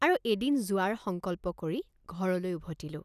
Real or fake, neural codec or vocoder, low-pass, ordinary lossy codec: real; none; 14.4 kHz; none